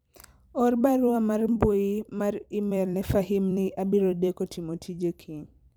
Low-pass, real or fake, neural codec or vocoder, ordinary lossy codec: none; fake; vocoder, 44.1 kHz, 128 mel bands every 256 samples, BigVGAN v2; none